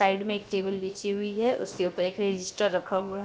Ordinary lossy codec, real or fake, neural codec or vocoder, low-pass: none; fake; codec, 16 kHz, 0.7 kbps, FocalCodec; none